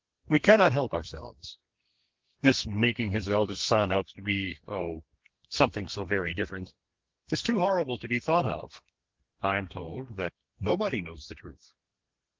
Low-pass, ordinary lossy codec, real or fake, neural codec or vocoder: 7.2 kHz; Opus, 16 kbps; fake; codec, 32 kHz, 1.9 kbps, SNAC